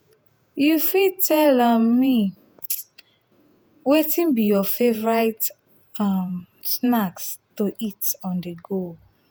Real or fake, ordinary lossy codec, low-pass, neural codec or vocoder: fake; none; none; vocoder, 48 kHz, 128 mel bands, Vocos